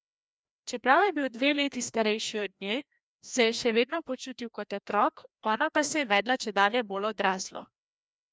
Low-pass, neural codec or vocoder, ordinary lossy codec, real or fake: none; codec, 16 kHz, 1 kbps, FreqCodec, larger model; none; fake